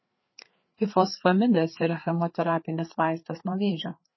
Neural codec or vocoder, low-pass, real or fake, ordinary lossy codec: codec, 16 kHz, 4 kbps, FreqCodec, larger model; 7.2 kHz; fake; MP3, 24 kbps